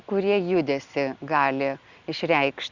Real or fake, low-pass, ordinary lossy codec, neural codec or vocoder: real; 7.2 kHz; Opus, 64 kbps; none